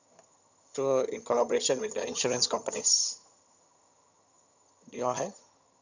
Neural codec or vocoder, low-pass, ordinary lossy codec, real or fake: vocoder, 22.05 kHz, 80 mel bands, HiFi-GAN; 7.2 kHz; none; fake